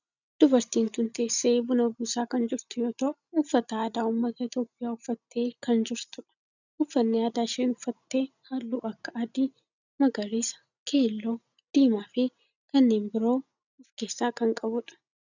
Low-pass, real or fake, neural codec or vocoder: 7.2 kHz; real; none